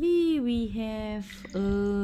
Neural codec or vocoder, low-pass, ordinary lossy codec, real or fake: none; 19.8 kHz; none; real